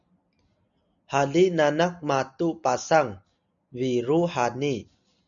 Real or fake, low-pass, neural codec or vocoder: real; 7.2 kHz; none